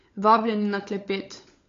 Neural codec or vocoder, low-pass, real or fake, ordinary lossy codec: codec, 16 kHz, 16 kbps, FunCodec, trained on LibriTTS, 50 frames a second; 7.2 kHz; fake; AAC, 48 kbps